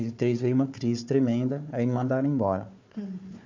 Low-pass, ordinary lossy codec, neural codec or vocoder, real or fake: 7.2 kHz; MP3, 64 kbps; codec, 16 kHz, 4 kbps, FunCodec, trained on Chinese and English, 50 frames a second; fake